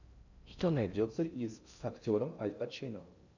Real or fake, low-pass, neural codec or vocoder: fake; 7.2 kHz; codec, 16 kHz in and 24 kHz out, 0.6 kbps, FocalCodec, streaming, 4096 codes